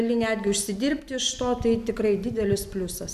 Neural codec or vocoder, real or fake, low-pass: none; real; 14.4 kHz